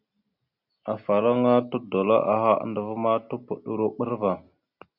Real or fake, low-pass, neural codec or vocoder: real; 5.4 kHz; none